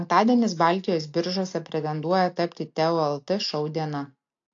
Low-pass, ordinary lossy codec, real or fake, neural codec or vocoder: 7.2 kHz; AAC, 48 kbps; real; none